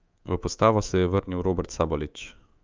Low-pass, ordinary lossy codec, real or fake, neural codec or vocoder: 7.2 kHz; Opus, 32 kbps; fake; codec, 24 kHz, 3.1 kbps, DualCodec